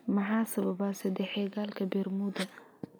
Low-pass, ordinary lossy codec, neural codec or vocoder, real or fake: none; none; none; real